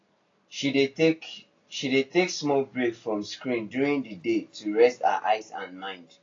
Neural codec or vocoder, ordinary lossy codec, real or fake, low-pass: none; AAC, 32 kbps; real; 7.2 kHz